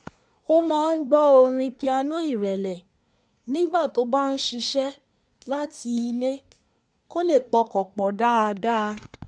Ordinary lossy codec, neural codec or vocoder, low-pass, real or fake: MP3, 96 kbps; codec, 24 kHz, 1 kbps, SNAC; 9.9 kHz; fake